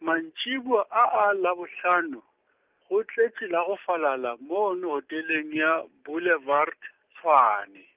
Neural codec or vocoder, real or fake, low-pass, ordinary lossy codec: none; real; 3.6 kHz; Opus, 32 kbps